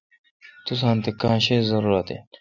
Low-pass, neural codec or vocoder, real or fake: 7.2 kHz; none; real